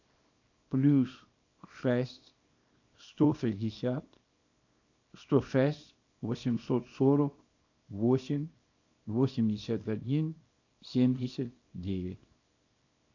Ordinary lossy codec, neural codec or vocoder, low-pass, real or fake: AAC, 48 kbps; codec, 24 kHz, 0.9 kbps, WavTokenizer, small release; 7.2 kHz; fake